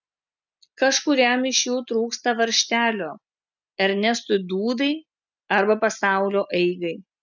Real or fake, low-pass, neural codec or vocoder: real; 7.2 kHz; none